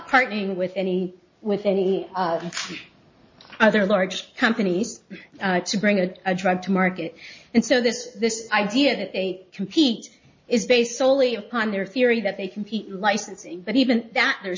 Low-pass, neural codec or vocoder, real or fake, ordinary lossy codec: 7.2 kHz; none; real; MP3, 32 kbps